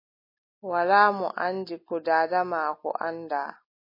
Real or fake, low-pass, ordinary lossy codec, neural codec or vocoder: real; 5.4 kHz; MP3, 24 kbps; none